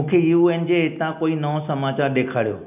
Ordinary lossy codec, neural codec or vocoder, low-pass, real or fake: none; none; 3.6 kHz; real